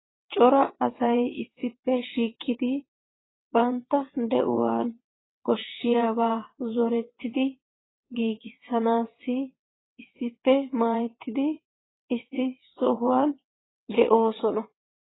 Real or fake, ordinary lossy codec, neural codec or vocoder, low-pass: fake; AAC, 16 kbps; vocoder, 22.05 kHz, 80 mel bands, WaveNeXt; 7.2 kHz